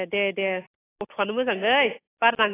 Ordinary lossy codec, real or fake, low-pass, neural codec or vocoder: AAC, 16 kbps; real; 3.6 kHz; none